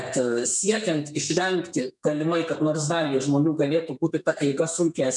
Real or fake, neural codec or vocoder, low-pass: fake; codec, 32 kHz, 1.9 kbps, SNAC; 10.8 kHz